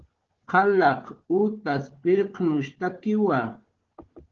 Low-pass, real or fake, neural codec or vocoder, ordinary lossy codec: 7.2 kHz; fake; codec, 16 kHz, 4 kbps, FunCodec, trained on Chinese and English, 50 frames a second; Opus, 16 kbps